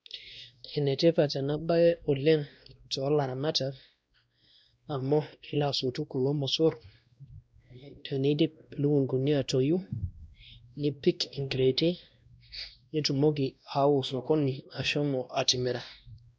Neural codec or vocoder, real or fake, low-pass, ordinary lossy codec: codec, 16 kHz, 1 kbps, X-Codec, WavLM features, trained on Multilingual LibriSpeech; fake; none; none